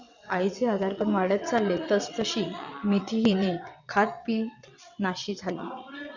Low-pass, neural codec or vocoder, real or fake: 7.2 kHz; vocoder, 22.05 kHz, 80 mel bands, WaveNeXt; fake